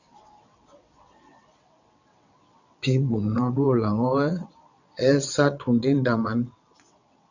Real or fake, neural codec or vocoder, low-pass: fake; vocoder, 22.05 kHz, 80 mel bands, WaveNeXt; 7.2 kHz